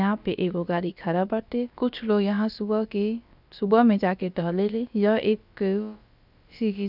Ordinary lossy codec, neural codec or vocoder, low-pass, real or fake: none; codec, 16 kHz, about 1 kbps, DyCAST, with the encoder's durations; 5.4 kHz; fake